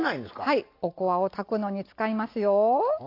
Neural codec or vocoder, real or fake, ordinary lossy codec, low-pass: none; real; AAC, 32 kbps; 5.4 kHz